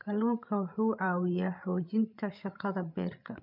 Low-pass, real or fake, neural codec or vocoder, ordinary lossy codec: 5.4 kHz; fake; codec, 16 kHz, 16 kbps, FreqCodec, larger model; none